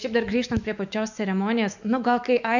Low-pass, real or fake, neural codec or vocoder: 7.2 kHz; fake; autoencoder, 48 kHz, 128 numbers a frame, DAC-VAE, trained on Japanese speech